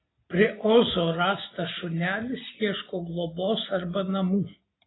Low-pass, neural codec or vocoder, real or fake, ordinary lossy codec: 7.2 kHz; none; real; AAC, 16 kbps